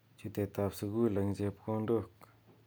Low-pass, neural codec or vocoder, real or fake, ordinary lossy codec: none; none; real; none